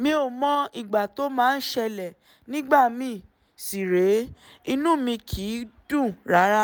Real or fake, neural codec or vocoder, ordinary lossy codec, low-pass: real; none; none; none